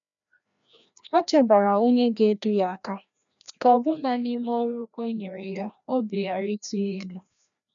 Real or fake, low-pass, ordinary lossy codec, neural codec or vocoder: fake; 7.2 kHz; none; codec, 16 kHz, 1 kbps, FreqCodec, larger model